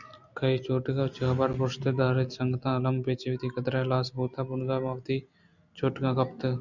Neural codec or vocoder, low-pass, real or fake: none; 7.2 kHz; real